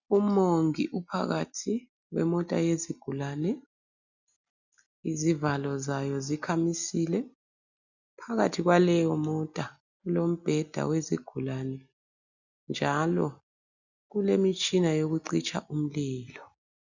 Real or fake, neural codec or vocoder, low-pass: real; none; 7.2 kHz